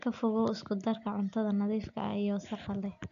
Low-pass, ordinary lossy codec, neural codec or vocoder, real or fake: 7.2 kHz; none; none; real